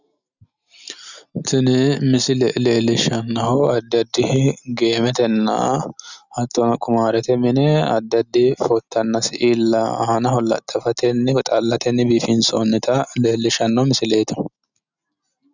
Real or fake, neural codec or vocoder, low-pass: real; none; 7.2 kHz